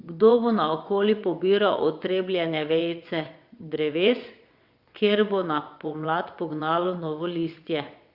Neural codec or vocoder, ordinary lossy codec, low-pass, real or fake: vocoder, 22.05 kHz, 80 mel bands, WaveNeXt; Opus, 64 kbps; 5.4 kHz; fake